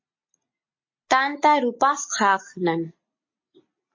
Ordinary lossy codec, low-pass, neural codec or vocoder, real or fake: MP3, 32 kbps; 7.2 kHz; none; real